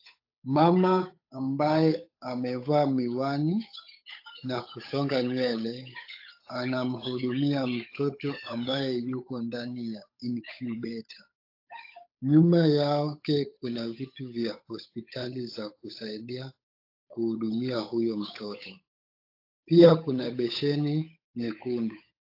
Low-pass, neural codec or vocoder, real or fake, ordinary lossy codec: 5.4 kHz; codec, 16 kHz, 8 kbps, FunCodec, trained on Chinese and English, 25 frames a second; fake; AAC, 32 kbps